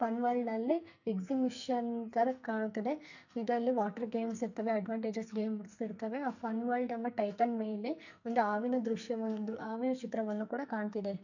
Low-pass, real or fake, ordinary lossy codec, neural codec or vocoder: 7.2 kHz; fake; none; codec, 44.1 kHz, 2.6 kbps, SNAC